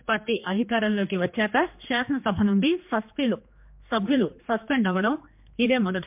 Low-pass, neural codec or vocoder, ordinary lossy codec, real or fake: 3.6 kHz; codec, 16 kHz, 2 kbps, X-Codec, HuBERT features, trained on general audio; MP3, 32 kbps; fake